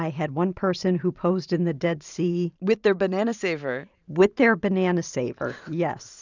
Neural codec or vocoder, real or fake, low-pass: vocoder, 44.1 kHz, 128 mel bands every 512 samples, BigVGAN v2; fake; 7.2 kHz